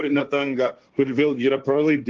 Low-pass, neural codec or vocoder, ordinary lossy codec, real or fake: 7.2 kHz; codec, 16 kHz, 1.1 kbps, Voila-Tokenizer; Opus, 32 kbps; fake